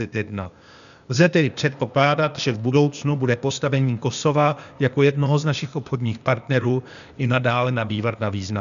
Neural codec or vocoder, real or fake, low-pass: codec, 16 kHz, 0.8 kbps, ZipCodec; fake; 7.2 kHz